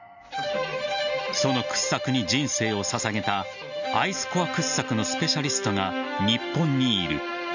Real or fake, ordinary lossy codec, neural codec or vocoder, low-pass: real; none; none; 7.2 kHz